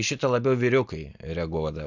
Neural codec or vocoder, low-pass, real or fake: none; 7.2 kHz; real